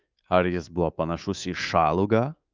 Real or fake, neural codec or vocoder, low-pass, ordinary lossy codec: fake; codec, 24 kHz, 3.1 kbps, DualCodec; 7.2 kHz; Opus, 32 kbps